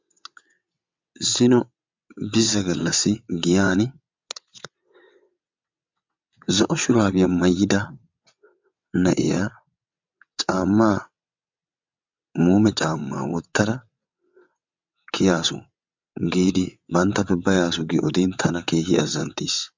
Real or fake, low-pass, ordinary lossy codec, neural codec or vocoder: fake; 7.2 kHz; MP3, 64 kbps; vocoder, 22.05 kHz, 80 mel bands, WaveNeXt